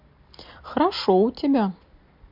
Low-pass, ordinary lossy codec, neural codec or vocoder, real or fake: 5.4 kHz; MP3, 48 kbps; none; real